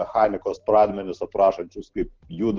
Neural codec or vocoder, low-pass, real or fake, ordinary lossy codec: none; 7.2 kHz; real; Opus, 16 kbps